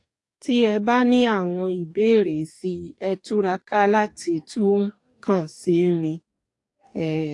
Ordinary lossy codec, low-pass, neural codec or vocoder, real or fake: AAC, 48 kbps; 10.8 kHz; codec, 44.1 kHz, 2.6 kbps, DAC; fake